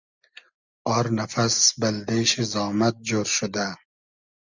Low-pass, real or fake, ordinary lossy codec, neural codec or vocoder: 7.2 kHz; real; Opus, 64 kbps; none